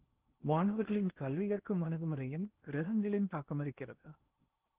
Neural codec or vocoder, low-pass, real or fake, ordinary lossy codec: codec, 16 kHz in and 24 kHz out, 0.6 kbps, FocalCodec, streaming, 2048 codes; 3.6 kHz; fake; Opus, 16 kbps